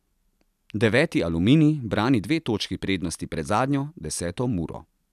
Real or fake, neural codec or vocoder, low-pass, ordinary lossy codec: real; none; 14.4 kHz; none